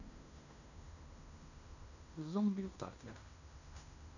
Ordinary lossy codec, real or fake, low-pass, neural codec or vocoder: none; fake; 7.2 kHz; codec, 16 kHz in and 24 kHz out, 0.9 kbps, LongCat-Audio-Codec, fine tuned four codebook decoder